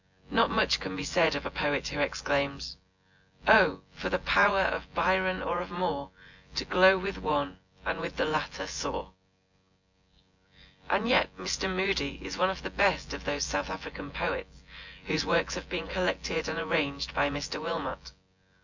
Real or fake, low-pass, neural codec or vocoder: fake; 7.2 kHz; vocoder, 24 kHz, 100 mel bands, Vocos